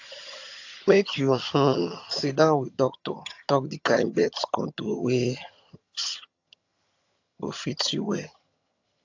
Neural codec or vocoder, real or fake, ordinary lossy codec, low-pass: vocoder, 22.05 kHz, 80 mel bands, HiFi-GAN; fake; AAC, 48 kbps; 7.2 kHz